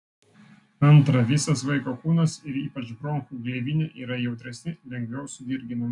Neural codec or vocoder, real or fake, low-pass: none; real; 10.8 kHz